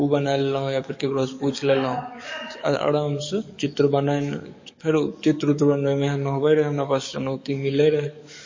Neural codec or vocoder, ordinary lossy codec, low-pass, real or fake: codec, 44.1 kHz, 7.8 kbps, DAC; MP3, 32 kbps; 7.2 kHz; fake